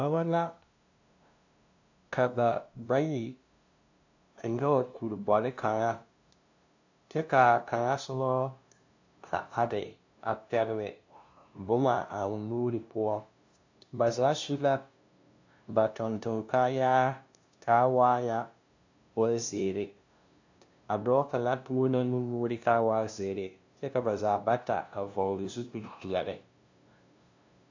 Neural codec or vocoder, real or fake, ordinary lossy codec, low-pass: codec, 16 kHz, 0.5 kbps, FunCodec, trained on LibriTTS, 25 frames a second; fake; AAC, 48 kbps; 7.2 kHz